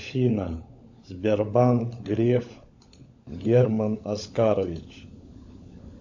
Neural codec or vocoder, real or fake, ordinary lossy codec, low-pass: codec, 16 kHz, 16 kbps, FunCodec, trained on LibriTTS, 50 frames a second; fake; AAC, 48 kbps; 7.2 kHz